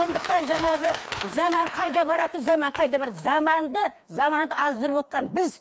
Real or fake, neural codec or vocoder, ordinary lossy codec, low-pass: fake; codec, 16 kHz, 2 kbps, FreqCodec, larger model; none; none